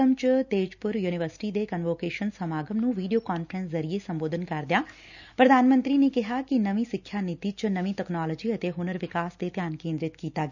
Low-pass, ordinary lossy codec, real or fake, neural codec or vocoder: 7.2 kHz; none; real; none